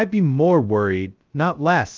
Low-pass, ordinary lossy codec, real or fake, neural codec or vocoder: 7.2 kHz; Opus, 24 kbps; fake; codec, 16 kHz, 0.2 kbps, FocalCodec